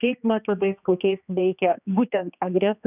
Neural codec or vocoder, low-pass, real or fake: codec, 16 kHz, 2 kbps, X-Codec, HuBERT features, trained on general audio; 3.6 kHz; fake